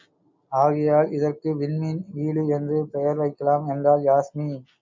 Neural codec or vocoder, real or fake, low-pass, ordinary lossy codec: none; real; 7.2 kHz; MP3, 64 kbps